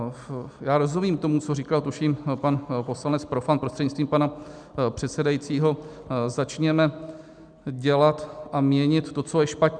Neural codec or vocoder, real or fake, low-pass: none; real; 9.9 kHz